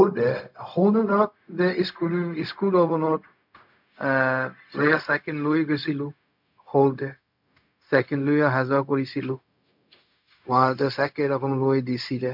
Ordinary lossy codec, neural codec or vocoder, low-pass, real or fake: MP3, 48 kbps; codec, 16 kHz, 0.4 kbps, LongCat-Audio-Codec; 5.4 kHz; fake